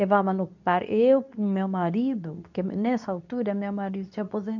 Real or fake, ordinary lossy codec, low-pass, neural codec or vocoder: fake; none; 7.2 kHz; codec, 24 kHz, 0.9 kbps, WavTokenizer, medium speech release version 2